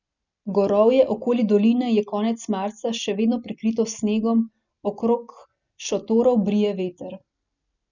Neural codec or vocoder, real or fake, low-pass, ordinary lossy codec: none; real; 7.2 kHz; none